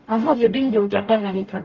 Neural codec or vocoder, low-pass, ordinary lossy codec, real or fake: codec, 44.1 kHz, 0.9 kbps, DAC; 7.2 kHz; Opus, 24 kbps; fake